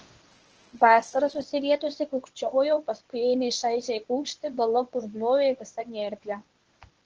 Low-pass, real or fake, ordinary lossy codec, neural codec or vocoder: 7.2 kHz; fake; Opus, 16 kbps; codec, 24 kHz, 0.9 kbps, WavTokenizer, medium speech release version 1